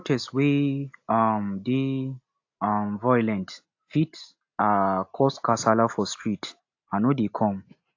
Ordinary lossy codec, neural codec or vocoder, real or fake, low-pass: AAC, 48 kbps; none; real; 7.2 kHz